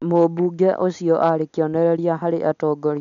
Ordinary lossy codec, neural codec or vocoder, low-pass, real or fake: none; none; 7.2 kHz; real